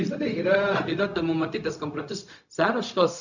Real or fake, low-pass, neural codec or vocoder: fake; 7.2 kHz; codec, 16 kHz, 0.4 kbps, LongCat-Audio-Codec